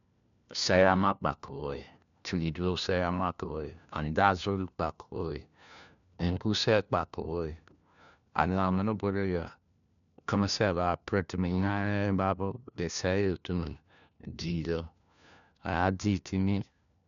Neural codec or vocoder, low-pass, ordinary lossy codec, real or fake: codec, 16 kHz, 1 kbps, FunCodec, trained on LibriTTS, 50 frames a second; 7.2 kHz; none; fake